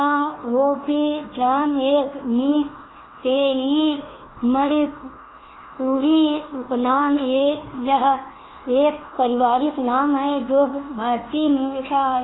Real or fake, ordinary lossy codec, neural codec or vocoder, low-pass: fake; AAC, 16 kbps; codec, 16 kHz, 1 kbps, FunCodec, trained on Chinese and English, 50 frames a second; 7.2 kHz